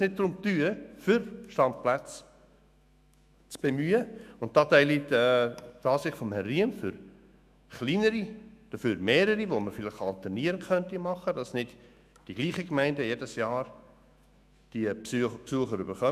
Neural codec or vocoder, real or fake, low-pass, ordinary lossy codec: autoencoder, 48 kHz, 128 numbers a frame, DAC-VAE, trained on Japanese speech; fake; 14.4 kHz; none